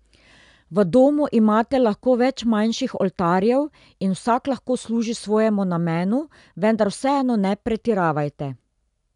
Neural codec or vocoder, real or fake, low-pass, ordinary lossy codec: none; real; 10.8 kHz; none